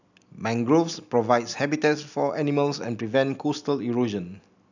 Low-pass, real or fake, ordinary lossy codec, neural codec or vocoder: 7.2 kHz; real; none; none